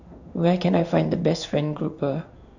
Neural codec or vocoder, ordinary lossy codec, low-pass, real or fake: codec, 16 kHz in and 24 kHz out, 1 kbps, XY-Tokenizer; none; 7.2 kHz; fake